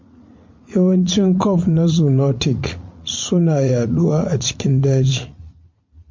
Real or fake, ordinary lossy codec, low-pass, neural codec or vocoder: fake; MP3, 48 kbps; 7.2 kHz; vocoder, 44.1 kHz, 80 mel bands, Vocos